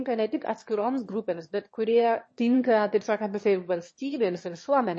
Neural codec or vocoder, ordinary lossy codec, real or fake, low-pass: autoencoder, 22.05 kHz, a latent of 192 numbers a frame, VITS, trained on one speaker; MP3, 32 kbps; fake; 9.9 kHz